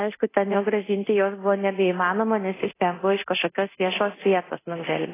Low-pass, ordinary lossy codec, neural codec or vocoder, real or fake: 3.6 kHz; AAC, 16 kbps; codec, 24 kHz, 0.9 kbps, DualCodec; fake